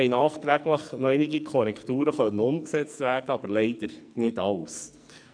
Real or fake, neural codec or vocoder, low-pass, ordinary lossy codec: fake; codec, 44.1 kHz, 2.6 kbps, SNAC; 9.9 kHz; none